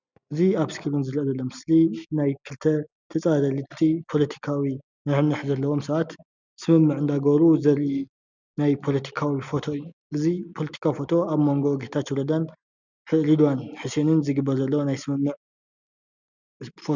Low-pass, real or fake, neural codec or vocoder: 7.2 kHz; real; none